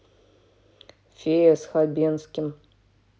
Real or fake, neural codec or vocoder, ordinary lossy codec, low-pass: real; none; none; none